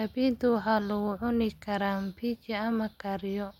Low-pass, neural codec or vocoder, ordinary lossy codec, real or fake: 19.8 kHz; none; MP3, 64 kbps; real